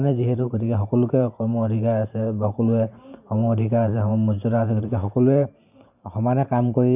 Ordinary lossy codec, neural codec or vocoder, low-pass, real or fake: none; vocoder, 44.1 kHz, 128 mel bands every 512 samples, BigVGAN v2; 3.6 kHz; fake